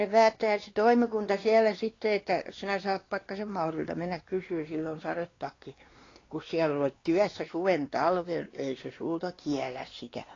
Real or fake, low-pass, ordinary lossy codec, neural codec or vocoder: fake; 7.2 kHz; AAC, 32 kbps; codec, 16 kHz, 2 kbps, X-Codec, WavLM features, trained on Multilingual LibriSpeech